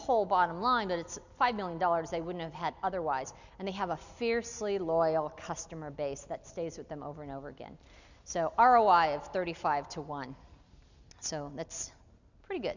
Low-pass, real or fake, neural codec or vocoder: 7.2 kHz; real; none